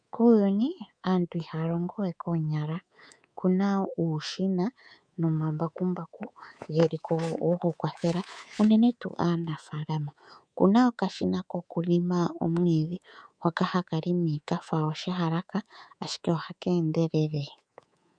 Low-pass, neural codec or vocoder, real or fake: 9.9 kHz; codec, 24 kHz, 3.1 kbps, DualCodec; fake